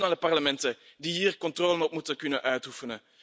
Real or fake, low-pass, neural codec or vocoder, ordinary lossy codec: real; none; none; none